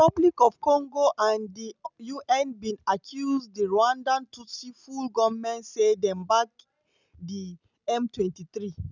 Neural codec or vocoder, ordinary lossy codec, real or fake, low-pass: none; none; real; 7.2 kHz